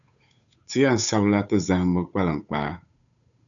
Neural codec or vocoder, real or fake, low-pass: codec, 16 kHz, 8 kbps, FunCodec, trained on Chinese and English, 25 frames a second; fake; 7.2 kHz